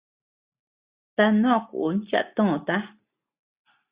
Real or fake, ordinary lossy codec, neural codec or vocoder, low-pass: real; Opus, 64 kbps; none; 3.6 kHz